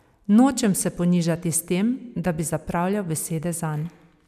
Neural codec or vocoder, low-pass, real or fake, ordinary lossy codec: none; 14.4 kHz; real; none